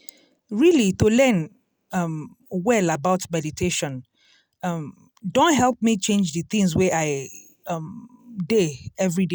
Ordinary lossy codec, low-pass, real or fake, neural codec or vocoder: none; none; real; none